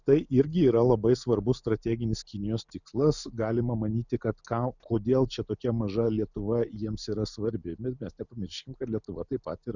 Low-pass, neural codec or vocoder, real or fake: 7.2 kHz; none; real